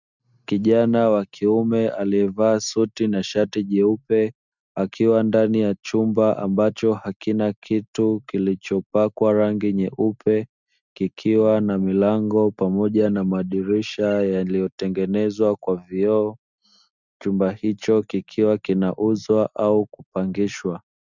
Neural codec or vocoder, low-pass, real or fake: none; 7.2 kHz; real